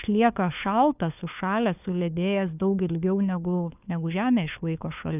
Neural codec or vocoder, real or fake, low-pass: codec, 16 kHz, 4 kbps, FunCodec, trained on LibriTTS, 50 frames a second; fake; 3.6 kHz